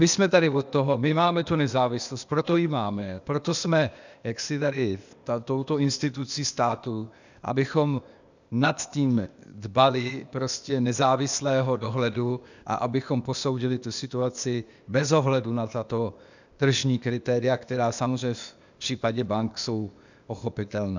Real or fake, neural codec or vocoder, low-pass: fake; codec, 16 kHz, 0.8 kbps, ZipCodec; 7.2 kHz